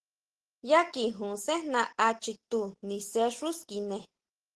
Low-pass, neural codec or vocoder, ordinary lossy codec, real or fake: 10.8 kHz; none; Opus, 16 kbps; real